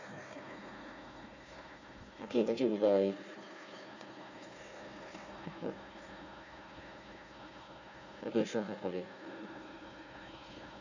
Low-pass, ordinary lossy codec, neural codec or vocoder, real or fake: 7.2 kHz; Opus, 64 kbps; codec, 16 kHz, 1 kbps, FunCodec, trained on Chinese and English, 50 frames a second; fake